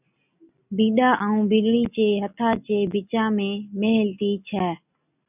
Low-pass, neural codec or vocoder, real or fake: 3.6 kHz; none; real